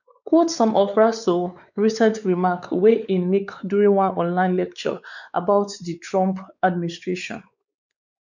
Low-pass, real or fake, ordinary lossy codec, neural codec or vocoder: 7.2 kHz; fake; none; codec, 16 kHz, 4 kbps, X-Codec, WavLM features, trained on Multilingual LibriSpeech